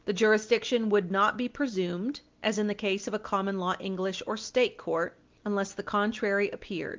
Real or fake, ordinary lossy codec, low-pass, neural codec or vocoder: real; Opus, 32 kbps; 7.2 kHz; none